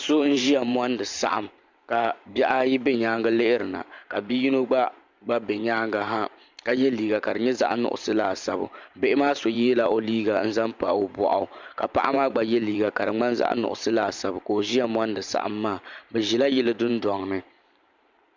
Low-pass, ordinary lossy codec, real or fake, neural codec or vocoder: 7.2 kHz; MP3, 64 kbps; fake; vocoder, 44.1 kHz, 128 mel bands every 512 samples, BigVGAN v2